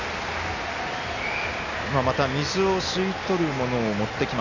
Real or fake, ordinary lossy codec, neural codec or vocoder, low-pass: real; none; none; 7.2 kHz